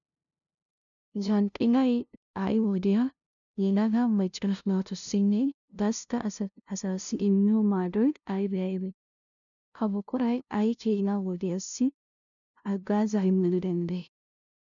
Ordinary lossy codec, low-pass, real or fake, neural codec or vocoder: MP3, 96 kbps; 7.2 kHz; fake; codec, 16 kHz, 0.5 kbps, FunCodec, trained on LibriTTS, 25 frames a second